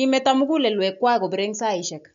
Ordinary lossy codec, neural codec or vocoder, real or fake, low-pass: none; none; real; 7.2 kHz